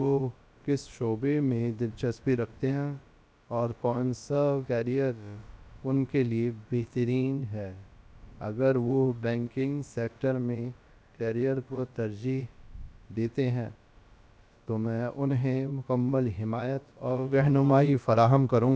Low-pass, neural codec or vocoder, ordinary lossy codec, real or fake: none; codec, 16 kHz, about 1 kbps, DyCAST, with the encoder's durations; none; fake